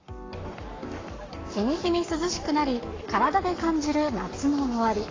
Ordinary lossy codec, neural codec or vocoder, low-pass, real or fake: AAC, 32 kbps; codec, 44.1 kHz, 7.8 kbps, Pupu-Codec; 7.2 kHz; fake